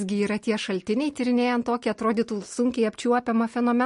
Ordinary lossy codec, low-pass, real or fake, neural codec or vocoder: MP3, 48 kbps; 10.8 kHz; real; none